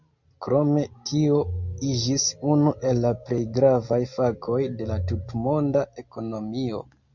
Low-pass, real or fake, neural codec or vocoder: 7.2 kHz; real; none